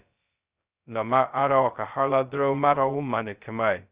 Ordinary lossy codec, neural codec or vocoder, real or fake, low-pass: Opus, 64 kbps; codec, 16 kHz, 0.2 kbps, FocalCodec; fake; 3.6 kHz